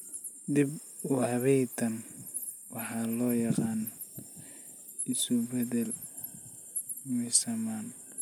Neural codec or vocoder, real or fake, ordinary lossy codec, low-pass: none; real; none; none